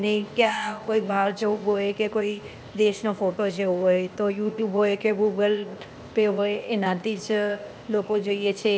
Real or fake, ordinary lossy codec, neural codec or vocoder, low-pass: fake; none; codec, 16 kHz, 0.8 kbps, ZipCodec; none